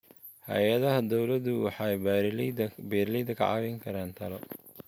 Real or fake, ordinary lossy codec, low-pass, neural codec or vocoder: real; none; none; none